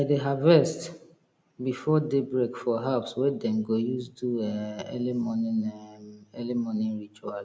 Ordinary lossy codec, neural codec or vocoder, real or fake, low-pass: none; none; real; none